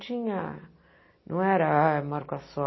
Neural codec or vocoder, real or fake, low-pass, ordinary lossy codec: none; real; 7.2 kHz; MP3, 24 kbps